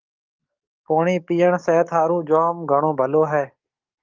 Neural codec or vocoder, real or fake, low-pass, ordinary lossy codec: codec, 44.1 kHz, 7.8 kbps, DAC; fake; 7.2 kHz; Opus, 24 kbps